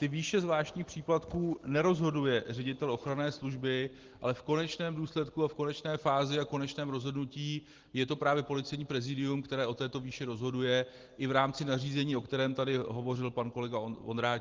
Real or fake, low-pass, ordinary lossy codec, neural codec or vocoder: real; 7.2 kHz; Opus, 16 kbps; none